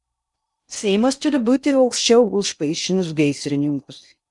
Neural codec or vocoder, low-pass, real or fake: codec, 16 kHz in and 24 kHz out, 0.6 kbps, FocalCodec, streaming, 2048 codes; 10.8 kHz; fake